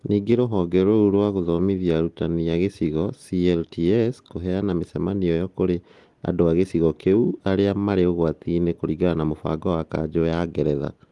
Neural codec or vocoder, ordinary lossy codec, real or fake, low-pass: none; Opus, 24 kbps; real; 10.8 kHz